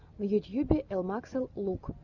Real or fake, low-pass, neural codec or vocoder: real; 7.2 kHz; none